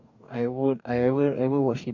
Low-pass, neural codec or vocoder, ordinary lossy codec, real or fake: 7.2 kHz; codec, 44.1 kHz, 2.6 kbps, DAC; none; fake